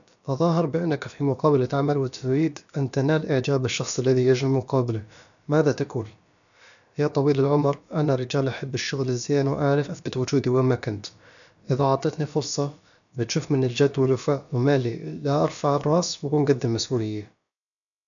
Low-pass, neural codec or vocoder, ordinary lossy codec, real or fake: 7.2 kHz; codec, 16 kHz, about 1 kbps, DyCAST, with the encoder's durations; none; fake